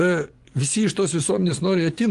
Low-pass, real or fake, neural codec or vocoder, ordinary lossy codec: 10.8 kHz; fake; vocoder, 24 kHz, 100 mel bands, Vocos; Opus, 24 kbps